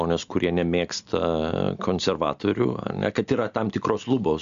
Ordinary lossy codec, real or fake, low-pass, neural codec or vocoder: AAC, 96 kbps; real; 7.2 kHz; none